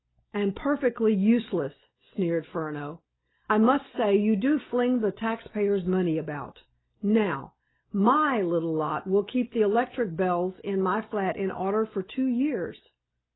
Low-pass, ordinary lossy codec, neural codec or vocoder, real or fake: 7.2 kHz; AAC, 16 kbps; none; real